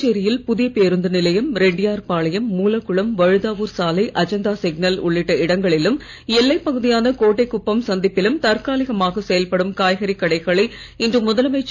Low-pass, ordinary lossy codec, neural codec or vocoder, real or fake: 7.2 kHz; none; none; real